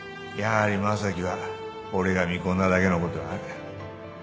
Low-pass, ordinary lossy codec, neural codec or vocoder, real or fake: none; none; none; real